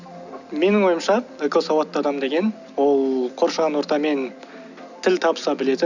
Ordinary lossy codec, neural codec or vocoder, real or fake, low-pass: none; none; real; 7.2 kHz